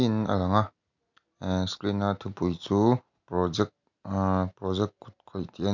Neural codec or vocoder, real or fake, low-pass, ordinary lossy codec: none; real; 7.2 kHz; none